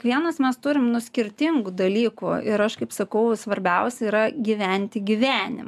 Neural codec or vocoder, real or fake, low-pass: none; real; 14.4 kHz